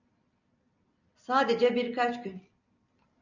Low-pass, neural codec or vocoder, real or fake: 7.2 kHz; none; real